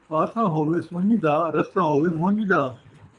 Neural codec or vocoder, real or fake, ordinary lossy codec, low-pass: codec, 24 kHz, 3 kbps, HILCodec; fake; MP3, 96 kbps; 10.8 kHz